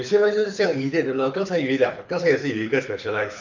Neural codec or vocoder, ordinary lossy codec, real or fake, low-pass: codec, 24 kHz, 6 kbps, HILCodec; none; fake; 7.2 kHz